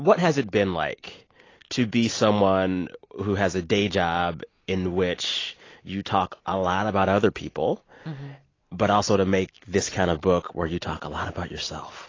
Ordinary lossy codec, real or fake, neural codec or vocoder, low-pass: AAC, 32 kbps; real; none; 7.2 kHz